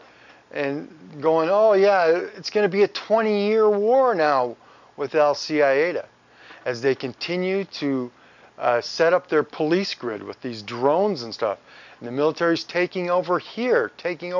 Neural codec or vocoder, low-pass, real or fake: none; 7.2 kHz; real